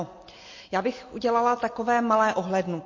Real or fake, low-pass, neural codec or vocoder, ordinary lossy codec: real; 7.2 kHz; none; MP3, 32 kbps